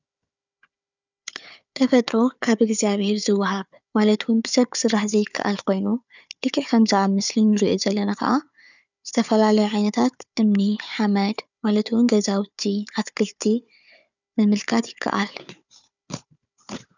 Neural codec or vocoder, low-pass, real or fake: codec, 16 kHz, 4 kbps, FunCodec, trained on Chinese and English, 50 frames a second; 7.2 kHz; fake